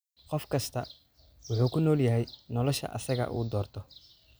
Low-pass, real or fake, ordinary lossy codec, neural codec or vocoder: none; real; none; none